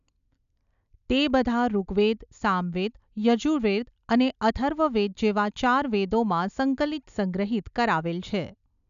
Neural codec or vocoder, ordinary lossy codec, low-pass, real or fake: none; none; 7.2 kHz; real